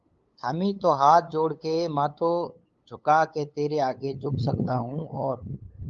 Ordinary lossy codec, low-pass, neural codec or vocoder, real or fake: Opus, 32 kbps; 7.2 kHz; codec, 16 kHz, 8 kbps, FunCodec, trained on LibriTTS, 25 frames a second; fake